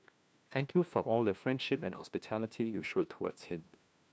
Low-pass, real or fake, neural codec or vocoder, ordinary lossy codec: none; fake; codec, 16 kHz, 1 kbps, FunCodec, trained on LibriTTS, 50 frames a second; none